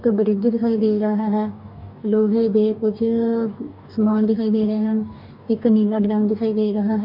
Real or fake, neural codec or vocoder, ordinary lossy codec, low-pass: fake; codec, 44.1 kHz, 2.6 kbps, DAC; MP3, 48 kbps; 5.4 kHz